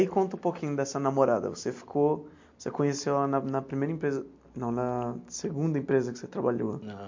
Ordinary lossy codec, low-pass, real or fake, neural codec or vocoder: MP3, 48 kbps; 7.2 kHz; real; none